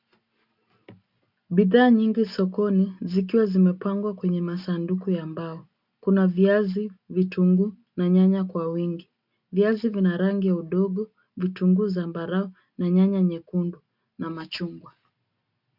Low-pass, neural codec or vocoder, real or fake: 5.4 kHz; none; real